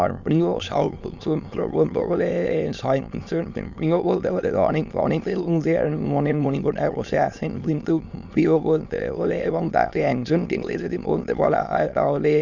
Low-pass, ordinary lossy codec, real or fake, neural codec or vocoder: 7.2 kHz; Opus, 64 kbps; fake; autoencoder, 22.05 kHz, a latent of 192 numbers a frame, VITS, trained on many speakers